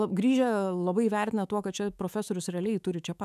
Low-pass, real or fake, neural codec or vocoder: 14.4 kHz; fake; autoencoder, 48 kHz, 128 numbers a frame, DAC-VAE, trained on Japanese speech